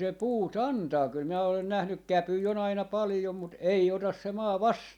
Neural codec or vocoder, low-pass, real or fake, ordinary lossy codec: none; 19.8 kHz; real; none